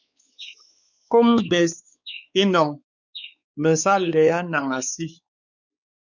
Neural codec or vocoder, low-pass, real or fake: codec, 16 kHz, 4 kbps, X-Codec, WavLM features, trained on Multilingual LibriSpeech; 7.2 kHz; fake